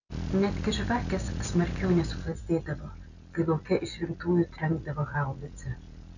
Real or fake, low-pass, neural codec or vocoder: real; 7.2 kHz; none